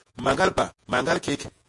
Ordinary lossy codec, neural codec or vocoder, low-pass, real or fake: MP3, 48 kbps; vocoder, 48 kHz, 128 mel bands, Vocos; 10.8 kHz; fake